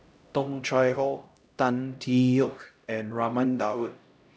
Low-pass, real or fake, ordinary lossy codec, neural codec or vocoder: none; fake; none; codec, 16 kHz, 0.5 kbps, X-Codec, HuBERT features, trained on LibriSpeech